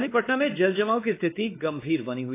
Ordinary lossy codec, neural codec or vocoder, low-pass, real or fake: AAC, 24 kbps; codec, 16 kHz, 2 kbps, X-Codec, WavLM features, trained on Multilingual LibriSpeech; 3.6 kHz; fake